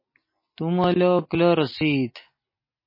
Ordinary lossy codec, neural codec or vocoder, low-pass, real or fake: MP3, 24 kbps; none; 5.4 kHz; real